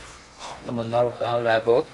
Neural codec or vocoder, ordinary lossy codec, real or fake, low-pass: codec, 16 kHz in and 24 kHz out, 0.6 kbps, FocalCodec, streaming, 2048 codes; AAC, 48 kbps; fake; 10.8 kHz